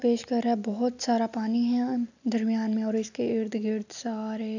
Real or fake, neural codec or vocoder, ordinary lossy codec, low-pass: real; none; none; 7.2 kHz